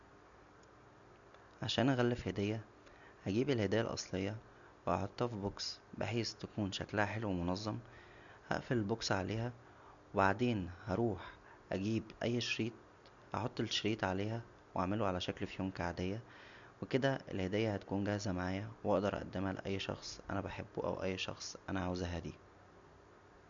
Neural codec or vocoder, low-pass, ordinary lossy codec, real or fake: none; 7.2 kHz; none; real